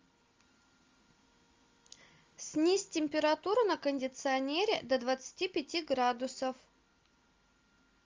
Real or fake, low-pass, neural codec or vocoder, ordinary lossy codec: real; 7.2 kHz; none; Opus, 32 kbps